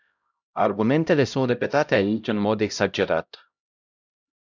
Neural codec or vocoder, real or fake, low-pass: codec, 16 kHz, 0.5 kbps, X-Codec, HuBERT features, trained on LibriSpeech; fake; 7.2 kHz